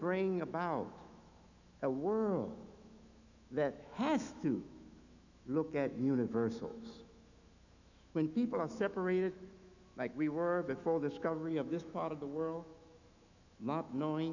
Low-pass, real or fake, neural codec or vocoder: 7.2 kHz; fake; autoencoder, 48 kHz, 128 numbers a frame, DAC-VAE, trained on Japanese speech